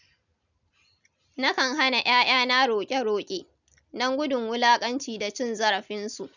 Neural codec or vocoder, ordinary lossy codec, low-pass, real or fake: none; none; 7.2 kHz; real